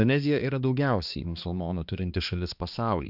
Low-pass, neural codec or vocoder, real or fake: 5.4 kHz; codec, 16 kHz, 2 kbps, X-Codec, HuBERT features, trained on balanced general audio; fake